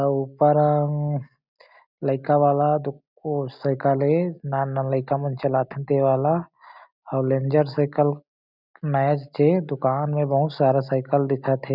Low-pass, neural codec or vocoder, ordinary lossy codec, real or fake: 5.4 kHz; none; none; real